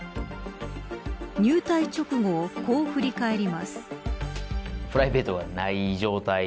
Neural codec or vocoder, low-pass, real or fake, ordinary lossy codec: none; none; real; none